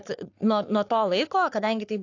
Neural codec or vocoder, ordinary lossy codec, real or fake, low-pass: codec, 44.1 kHz, 3.4 kbps, Pupu-Codec; AAC, 48 kbps; fake; 7.2 kHz